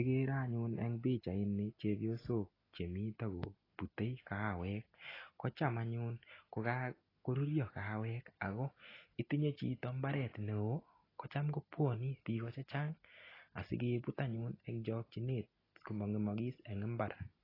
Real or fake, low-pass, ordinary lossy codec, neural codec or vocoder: real; 5.4 kHz; AAC, 24 kbps; none